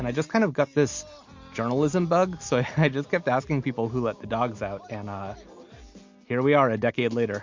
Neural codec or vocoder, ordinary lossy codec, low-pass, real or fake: none; MP3, 48 kbps; 7.2 kHz; real